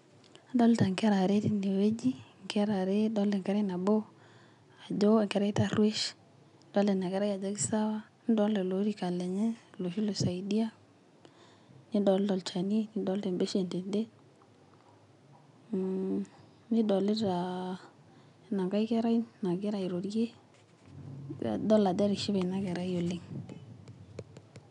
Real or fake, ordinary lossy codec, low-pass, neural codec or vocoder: real; none; 10.8 kHz; none